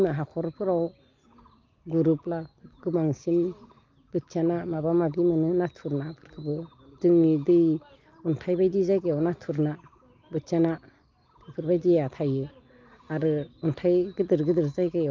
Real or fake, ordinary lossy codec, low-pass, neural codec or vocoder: real; Opus, 24 kbps; 7.2 kHz; none